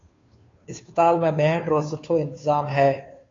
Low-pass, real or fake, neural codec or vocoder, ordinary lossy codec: 7.2 kHz; fake; codec, 16 kHz, 2 kbps, FunCodec, trained on Chinese and English, 25 frames a second; AAC, 32 kbps